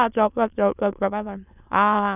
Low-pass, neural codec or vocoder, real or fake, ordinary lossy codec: 3.6 kHz; autoencoder, 22.05 kHz, a latent of 192 numbers a frame, VITS, trained on many speakers; fake; none